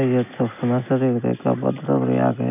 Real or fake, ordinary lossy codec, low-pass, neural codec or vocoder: real; none; 3.6 kHz; none